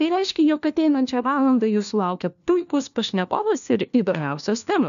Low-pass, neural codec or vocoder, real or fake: 7.2 kHz; codec, 16 kHz, 1 kbps, FunCodec, trained on LibriTTS, 50 frames a second; fake